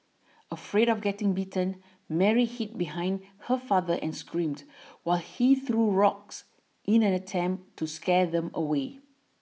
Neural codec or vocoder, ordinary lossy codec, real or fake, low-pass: none; none; real; none